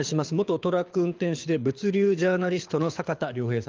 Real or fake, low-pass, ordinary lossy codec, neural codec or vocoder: fake; 7.2 kHz; Opus, 24 kbps; codec, 24 kHz, 6 kbps, HILCodec